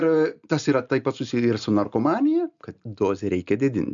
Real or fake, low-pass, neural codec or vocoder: real; 7.2 kHz; none